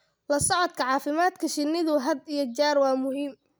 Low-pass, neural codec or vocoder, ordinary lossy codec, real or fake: none; none; none; real